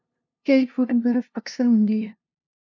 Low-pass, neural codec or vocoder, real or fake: 7.2 kHz; codec, 16 kHz, 0.5 kbps, FunCodec, trained on LibriTTS, 25 frames a second; fake